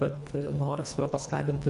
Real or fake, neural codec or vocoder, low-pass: fake; codec, 24 kHz, 1.5 kbps, HILCodec; 10.8 kHz